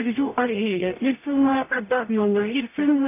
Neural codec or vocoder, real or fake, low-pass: codec, 44.1 kHz, 0.9 kbps, DAC; fake; 3.6 kHz